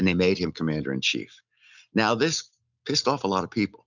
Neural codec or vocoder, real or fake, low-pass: none; real; 7.2 kHz